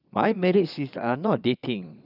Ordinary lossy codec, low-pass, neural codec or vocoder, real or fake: none; 5.4 kHz; vocoder, 22.05 kHz, 80 mel bands, Vocos; fake